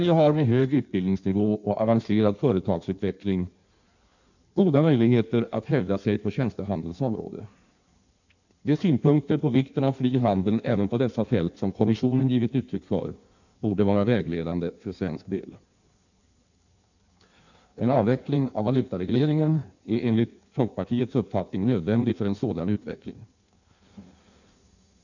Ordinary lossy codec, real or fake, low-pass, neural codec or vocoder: none; fake; 7.2 kHz; codec, 16 kHz in and 24 kHz out, 1.1 kbps, FireRedTTS-2 codec